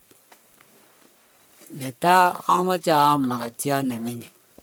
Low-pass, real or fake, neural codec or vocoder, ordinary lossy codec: none; fake; codec, 44.1 kHz, 1.7 kbps, Pupu-Codec; none